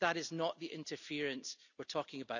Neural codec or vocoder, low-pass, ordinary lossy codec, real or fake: none; 7.2 kHz; none; real